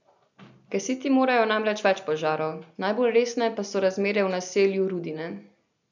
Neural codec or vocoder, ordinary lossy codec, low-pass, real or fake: none; none; 7.2 kHz; real